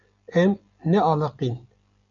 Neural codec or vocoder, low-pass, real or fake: none; 7.2 kHz; real